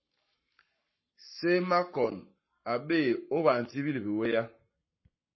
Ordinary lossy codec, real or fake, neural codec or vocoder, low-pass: MP3, 24 kbps; fake; codec, 44.1 kHz, 7.8 kbps, Pupu-Codec; 7.2 kHz